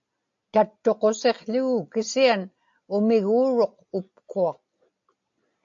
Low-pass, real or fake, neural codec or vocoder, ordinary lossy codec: 7.2 kHz; real; none; AAC, 64 kbps